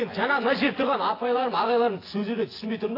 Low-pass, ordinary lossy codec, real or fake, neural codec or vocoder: 5.4 kHz; AAC, 24 kbps; fake; vocoder, 24 kHz, 100 mel bands, Vocos